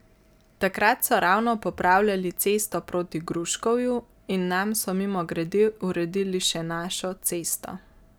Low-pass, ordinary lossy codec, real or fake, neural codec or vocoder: none; none; real; none